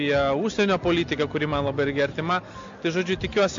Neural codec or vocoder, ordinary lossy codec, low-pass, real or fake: none; MP3, 48 kbps; 7.2 kHz; real